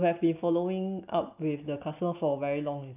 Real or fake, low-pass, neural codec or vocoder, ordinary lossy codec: real; 3.6 kHz; none; none